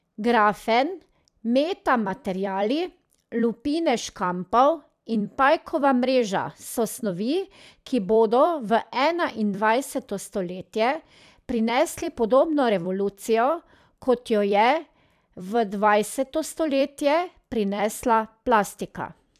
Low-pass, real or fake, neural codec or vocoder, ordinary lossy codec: 14.4 kHz; fake; vocoder, 44.1 kHz, 128 mel bands every 256 samples, BigVGAN v2; none